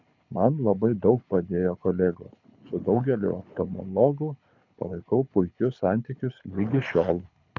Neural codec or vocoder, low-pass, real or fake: codec, 24 kHz, 6 kbps, HILCodec; 7.2 kHz; fake